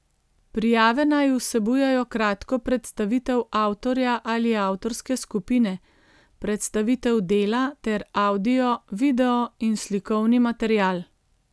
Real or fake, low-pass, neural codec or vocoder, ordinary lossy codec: real; none; none; none